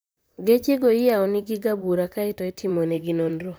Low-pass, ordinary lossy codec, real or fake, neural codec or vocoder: none; none; fake; vocoder, 44.1 kHz, 128 mel bands, Pupu-Vocoder